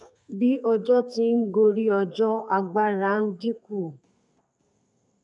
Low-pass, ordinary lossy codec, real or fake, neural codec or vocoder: 10.8 kHz; none; fake; codec, 32 kHz, 1.9 kbps, SNAC